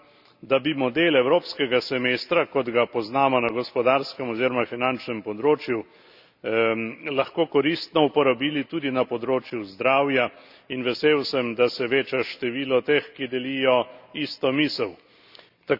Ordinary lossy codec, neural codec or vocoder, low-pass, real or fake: none; none; 5.4 kHz; real